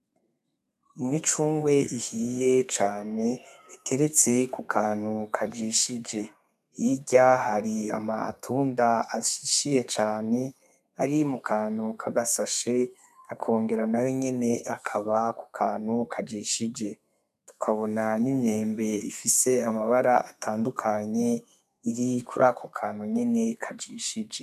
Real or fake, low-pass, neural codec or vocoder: fake; 14.4 kHz; codec, 32 kHz, 1.9 kbps, SNAC